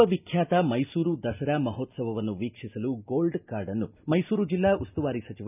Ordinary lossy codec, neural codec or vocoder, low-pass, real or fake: none; none; 3.6 kHz; real